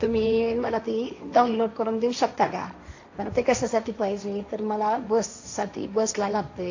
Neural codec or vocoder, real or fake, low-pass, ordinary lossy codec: codec, 16 kHz, 1.1 kbps, Voila-Tokenizer; fake; 7.2 kHz; AAC, 48 kbps